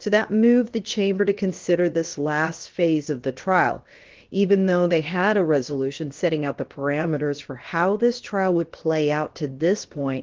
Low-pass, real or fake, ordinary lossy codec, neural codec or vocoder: 7.2 kHz; fake; Opus, 16 kbps; codec, 16 kHz, about 1 kbps, DyCAST, with the encoder's durations